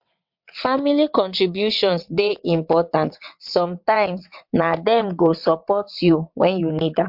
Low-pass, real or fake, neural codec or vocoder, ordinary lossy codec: 5.4 kHz; fake; vocoder, 22.05 kHz, 80 mel bands, WaveNeXt; MP3, 48 kbps